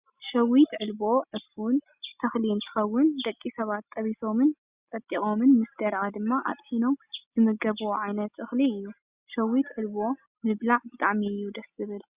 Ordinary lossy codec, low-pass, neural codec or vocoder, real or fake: Opus, 64 kbps; 3.6 kHz; none; real